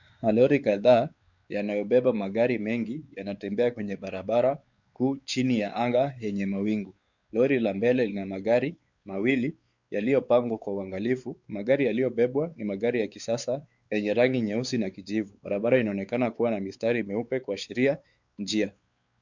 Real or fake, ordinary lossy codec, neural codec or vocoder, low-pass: fake; Opus, 64 kbps; codec, 16 kHz, 4 kbps, X-Codec, WavLM features, trained on Multilingual LibriSpeech; 7.2 kHz